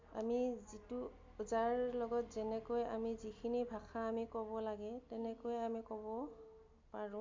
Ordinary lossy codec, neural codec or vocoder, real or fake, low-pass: MP3, 64 kbps; none; real; 7.2 kHz